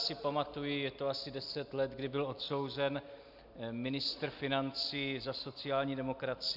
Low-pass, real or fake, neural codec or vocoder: 5.4 kHz; real; none